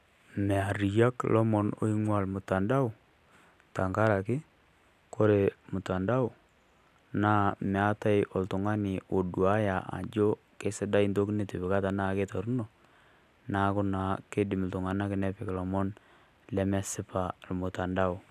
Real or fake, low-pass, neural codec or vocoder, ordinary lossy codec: real; 14.4 kHz; none; none